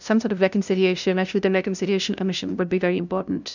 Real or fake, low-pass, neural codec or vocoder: fake; 7.2 kHz; codec, 16 kHz, 0.5 kbps, FunCodec, trained on LibriTTS, 25 frames a second